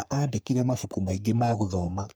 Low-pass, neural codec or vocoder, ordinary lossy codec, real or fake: none; codec, 44.1 kHz, 3.4 kbps, Pupu-Codec; none; fake